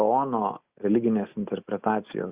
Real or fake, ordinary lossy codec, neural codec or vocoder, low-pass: real; Opus, 64 kbps; none; 3.6 kHz